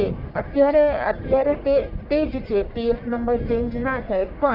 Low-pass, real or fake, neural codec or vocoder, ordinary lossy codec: 5.4 kHz; fake; codec, 44.1 kHz, 1.7 kbps, Pupu-Codec; none